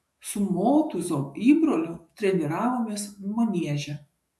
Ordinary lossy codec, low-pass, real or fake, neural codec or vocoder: MP3, 64 kbps; 14.4 kHz; fake; autoencoder, 48 kHz, 128 numbers a frame, DAC-VAE, trained on Japanese speech